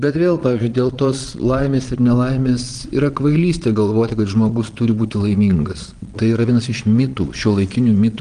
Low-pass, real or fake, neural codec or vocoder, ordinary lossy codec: 9.9 kHz; fake; vocoder, 22.05 kHz, 80 mel bands, Vocos; Opus, 24 kbps